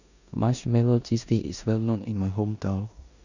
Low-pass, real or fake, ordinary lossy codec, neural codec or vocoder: 7.2 kHz; fake; none; codec, 16 kHz in and 24 kHz out, 0.9 kbps, LongCat-Audio-Codec, four codebook decoder